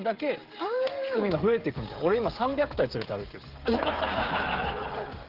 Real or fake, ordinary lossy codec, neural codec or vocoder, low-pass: fake; Opus, 16 kbps; codec, 16 kHz, 16 kbps, FreqCodec, smaller model; 5.4 kHz